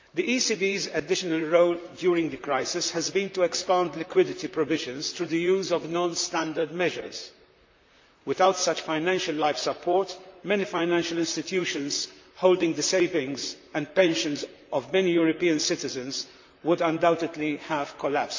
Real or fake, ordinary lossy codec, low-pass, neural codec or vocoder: fake; MP3, 64 kbps; 7.2 kHz; vocoder, 44.1 kHz, 128 mel bands, Pupu-Vocoder